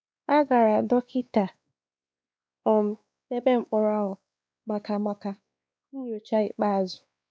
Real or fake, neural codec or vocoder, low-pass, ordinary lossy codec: fake; codec, 16 kHz, 2 kbps, X-Codec, WavLM features, trained on Multilingual LibriSpeech; none; none